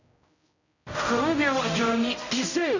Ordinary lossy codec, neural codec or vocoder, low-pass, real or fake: none; codec, 16 kHz, 0.5 kbps, X-Codec, HuBERT features, trained on general audio; 7.2 kHz; fake